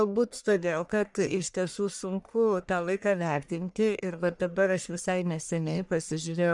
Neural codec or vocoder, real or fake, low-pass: codec, 44.1 kHz, 1.7 kbps, Pupu-Codec; fake; 10.8 kHz